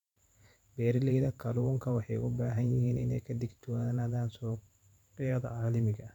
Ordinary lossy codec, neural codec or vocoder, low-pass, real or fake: none; vocoder, 44.1 kHz, 128 mel bands every 512 samples, BigVGAN v2; 19.8 kHz; fake